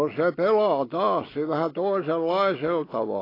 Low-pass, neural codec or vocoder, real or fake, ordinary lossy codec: 5.4 kHz; none; real; AAC, 24 kbps